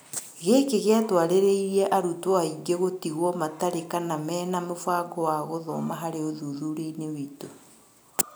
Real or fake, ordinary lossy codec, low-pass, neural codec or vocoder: real; none; none; none